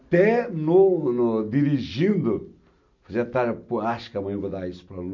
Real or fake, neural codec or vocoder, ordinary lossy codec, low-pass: real; none; none; 7.2 kHz